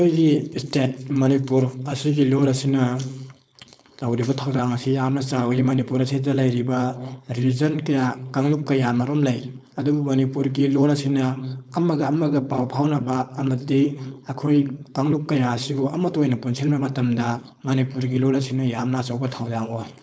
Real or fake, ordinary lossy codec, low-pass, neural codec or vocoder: fake; none; none; codec, 16 kHz, 4.8 kbps, FACodec